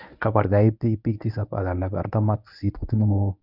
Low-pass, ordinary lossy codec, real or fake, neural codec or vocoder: 5.4 kHz; none; fake; codec, 24 kHz, 0.9 kbps, WavTokenizer, medium speech release version 1